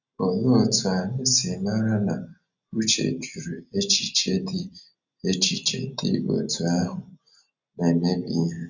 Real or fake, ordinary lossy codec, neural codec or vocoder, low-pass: real; none; none; 7.2 kHz